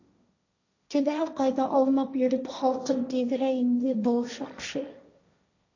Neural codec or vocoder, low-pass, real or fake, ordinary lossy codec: codec, 16 kHz, 1.1 kbps, Voila-Tokenizer; 7.2 kHz; fake; AAC, 48 kbps